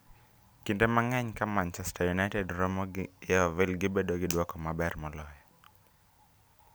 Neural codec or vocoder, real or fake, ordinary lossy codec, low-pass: none; real; none; none